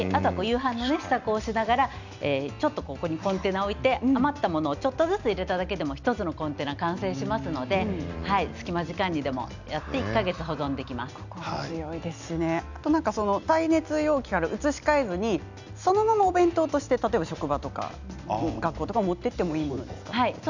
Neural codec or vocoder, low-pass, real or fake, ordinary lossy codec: none; 7.2 kHz; real; none